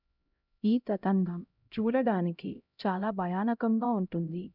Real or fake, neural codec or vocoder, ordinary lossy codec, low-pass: fake; codec, 16 kHz, 0.5 kbps, X-Codec, HuBERT features, trained on LibriSpeech; none; 5.4 kHz